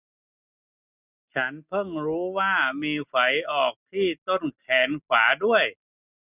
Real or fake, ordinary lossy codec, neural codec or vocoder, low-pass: real; none; none; 3.6 kHz